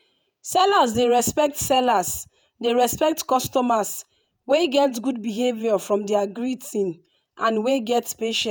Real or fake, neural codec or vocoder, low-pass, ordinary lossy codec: fake; vocoder, 48 kHz, 128 mel bands, Vocos; none; none